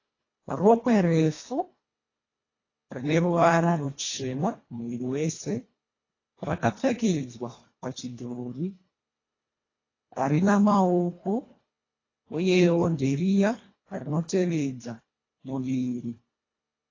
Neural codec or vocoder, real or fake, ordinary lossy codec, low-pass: codec, 24 kHz, 1.5 kbps, HILCodec; fake; AAC, 32 kbps; 7.2 kHz